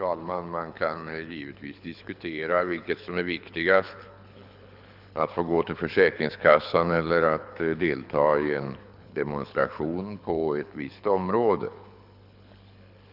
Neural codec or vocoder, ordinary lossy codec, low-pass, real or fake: codec, 24 kHz, 6 kbps, HILCodec; none; 5.4 kHz; fake